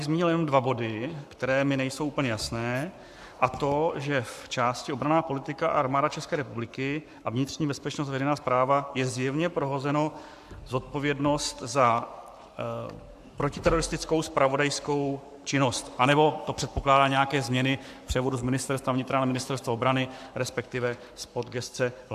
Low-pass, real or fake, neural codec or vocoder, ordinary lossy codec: 14.4 kHz; fake; codec, 44.1 kHz, 7.8 kbps, Pupu-Codec; AAC, 96 kbps